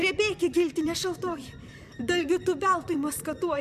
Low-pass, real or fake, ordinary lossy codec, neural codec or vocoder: 14.4 kHz; fake; MP3, 96 kbps; vocoder, 44.1 kHz, 128 mel bands, Pupu-Vocoder